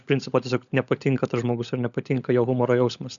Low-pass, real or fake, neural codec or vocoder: 7.2 kHz; fake; codec, 16 kHz, 16 kbps, FunCodec, trained on LibriTTS, 50 frames a second